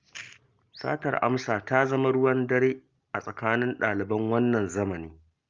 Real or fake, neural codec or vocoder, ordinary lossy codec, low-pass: real; none; Opus, 32 kbps; 7.2 kHz